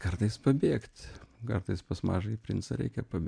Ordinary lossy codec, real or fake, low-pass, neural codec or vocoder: AAC, 64 kbps; real; 9.9 kHz; none